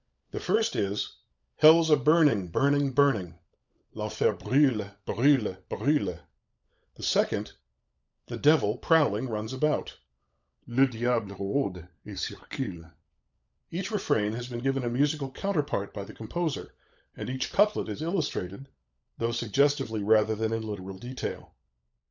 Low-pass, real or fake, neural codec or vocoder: 7.2 kHz; fake; codec, 16 kHz, 16 kbps, FunCodec, trained on LibriTTS, 50 frames a second